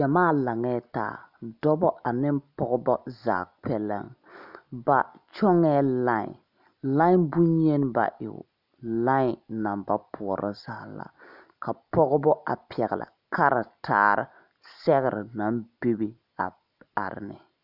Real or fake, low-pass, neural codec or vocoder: real; 5.4 kHz; none